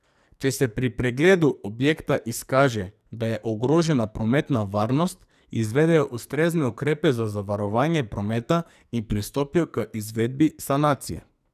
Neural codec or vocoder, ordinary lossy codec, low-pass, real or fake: codec, 44.1 kHz, 2.6 kbps, SNAC; none; 14.4 kHz; fake